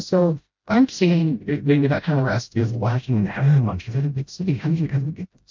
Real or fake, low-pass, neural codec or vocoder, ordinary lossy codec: fake; 7.2 kHz; codec, 16 kHz, 0.5 kbps, FreqCodec, smaller model; MP3, 48 kbps